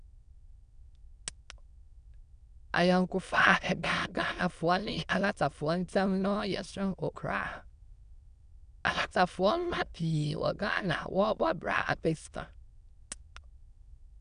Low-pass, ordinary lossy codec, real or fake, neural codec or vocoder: 9.9 kHz; none; fake; autoencoder, 22.05 kHz, a latent of 192 numbers a frame, VITS, trained on many speakers